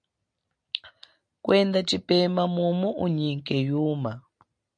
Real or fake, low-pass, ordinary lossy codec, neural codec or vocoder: real; 9.9 kHz; MP3, 96 kbps; none